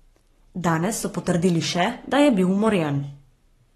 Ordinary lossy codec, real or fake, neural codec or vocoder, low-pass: AAC, 32 kbps; fake; codec, 44.1 kHz, 7.8 kbps, Pupu-Codec; 19.8 kHz